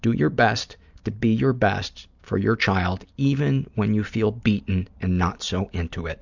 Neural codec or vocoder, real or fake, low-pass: none; real; 7.2 kHz